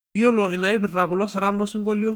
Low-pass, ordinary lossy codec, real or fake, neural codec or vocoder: none; none; fake; codec, 44.1 kHz, 2.6 kbps, DAC